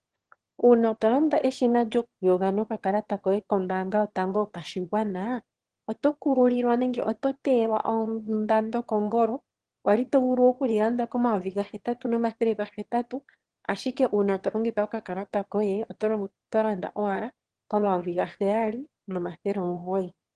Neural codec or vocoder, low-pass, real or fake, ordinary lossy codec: autoencoder, 22.05 kHz, a latent of 192 numbers a frame, VITS, trained on one speaker; 9.9 kHz; fake; Opus, 16 kbps